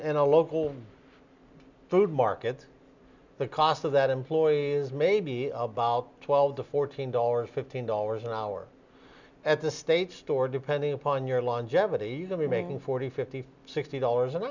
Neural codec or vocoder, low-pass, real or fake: none; 7.2 kHz; real